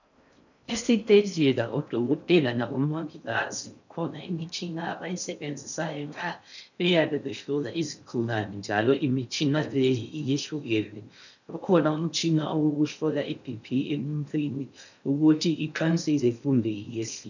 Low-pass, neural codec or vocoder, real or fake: 7.2 kHz; codec, 16 kHz in and 24 kHz out, 0.6 kbps, FocalCodec, streaming, 4096 codes; fake